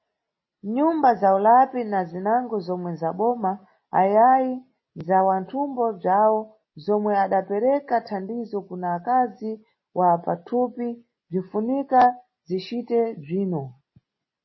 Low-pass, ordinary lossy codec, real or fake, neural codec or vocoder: 7.2 kHz; MP3, 24 kbps; real; none